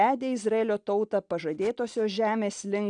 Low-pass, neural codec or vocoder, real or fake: 9.9 kHz; none; real